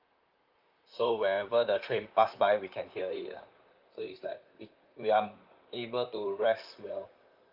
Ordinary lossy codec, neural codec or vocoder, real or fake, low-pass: Opus, 32 kbps; vocoder, 44.1 kHz, 128 mel bands, Pupu-Vocoder; fake; 5.4 kHz